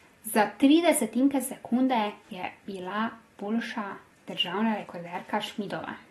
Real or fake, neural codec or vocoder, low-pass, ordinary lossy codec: real; none; 14.4 kHz; AAC, 32 kbps